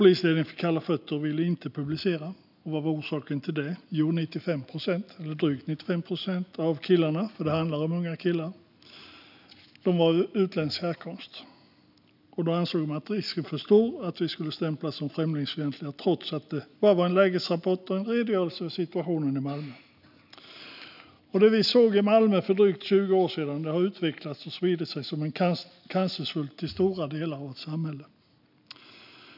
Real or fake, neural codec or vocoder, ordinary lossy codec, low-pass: real; none; none; 5.4 kHz